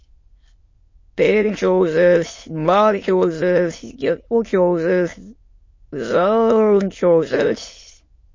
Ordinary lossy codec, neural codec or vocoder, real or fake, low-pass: MP3, 32 kbps; autoencoder, 22.05 kHz, a latent of 192 numbers a frame, VITS, trained on many speakers; fake; 7.2 kHz